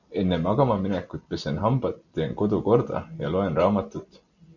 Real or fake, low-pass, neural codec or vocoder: real; 7.2 kHz; none